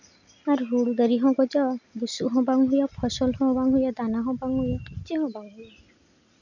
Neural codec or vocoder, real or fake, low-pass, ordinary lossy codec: none; real; 7.2 kHz; none